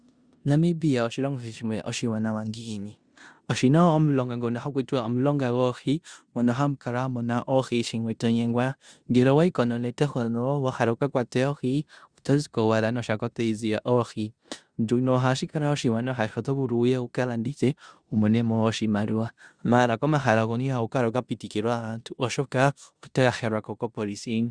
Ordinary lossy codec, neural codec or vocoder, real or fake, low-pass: Opus, 64 kbps; codec, 16 kHz in and 24 kHz out, 0.9 kbps, LongCat-Audio-Codec, four codebook decoder; fake; 9.9 kHz